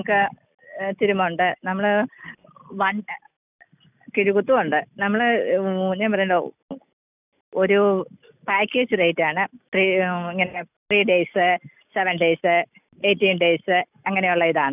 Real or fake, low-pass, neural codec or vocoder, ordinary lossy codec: real; 3.6 kHz; none; none